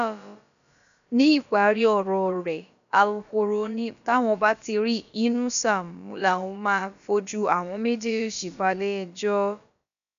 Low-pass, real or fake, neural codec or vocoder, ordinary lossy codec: 7.2 kHz; fake; codec, 16 kHz, about 1 kbps, DyCAST, with the encoder's durations; none